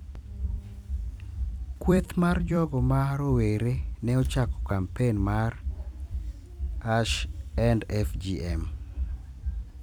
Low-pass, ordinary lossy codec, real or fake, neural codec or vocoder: 19.8 kHz; none; fake; vocoder, 44.1 kHz, 128 mel bands every 256 samples, BigVGAN v2